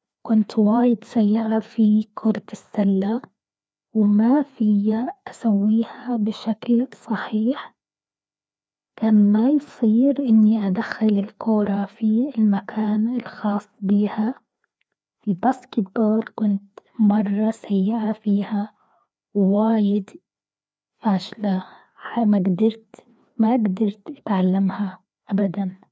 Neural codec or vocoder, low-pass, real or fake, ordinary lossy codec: codec, 16 kHz, 2 kbps, FreqCodec, larger model; none; fake; none